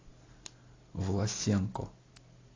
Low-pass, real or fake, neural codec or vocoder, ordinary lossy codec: 7.2 kHz; real; none; AAC, 32 kbps